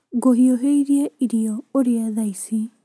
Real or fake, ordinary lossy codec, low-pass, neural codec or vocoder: real; none; none; none